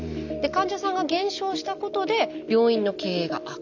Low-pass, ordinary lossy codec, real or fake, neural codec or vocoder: 7.2 kHz; none; real; none